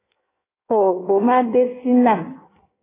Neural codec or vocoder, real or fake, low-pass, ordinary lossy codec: codec, 16 kHz in and 24 kHz out, 1.1 kbps, FireRedTTS-2 codec; fake; 3.6 kHz; AAC, 16 kbps